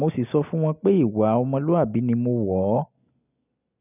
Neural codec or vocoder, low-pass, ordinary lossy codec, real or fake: none; 3.6 kHz; none; real